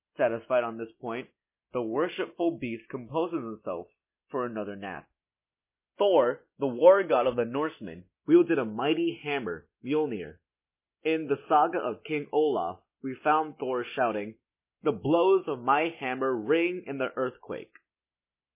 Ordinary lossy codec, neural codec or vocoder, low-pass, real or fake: MP3, 24 kbps; none; 3.6 kHz; real